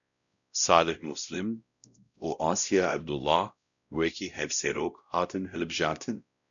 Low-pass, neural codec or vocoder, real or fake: 7.2 kHz; codec, 16 kHz, 0.5 kbps, X-Codec, WavLM features, trained on Multilingual LibriSpeech; fake